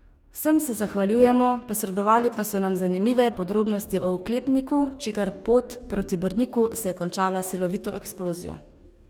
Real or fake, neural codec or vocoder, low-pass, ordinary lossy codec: fake; codec, 44.1 kHz, 2.6 kbps, DAC; 19.8 kHz; none